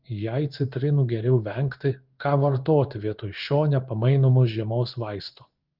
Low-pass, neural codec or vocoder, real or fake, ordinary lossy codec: 5.4 kHz; codec, 16 kHz in and 24 kHz out, 1 kbps, XY-Tokenizer; fake; Opus, 32 kbps